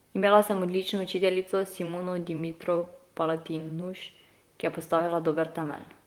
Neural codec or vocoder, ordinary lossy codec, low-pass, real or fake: vocoder, 44.1 kHz, 128 mel bands, Pupu-Vocoder; Opus, 24 kbps; 19.8 kHz; fake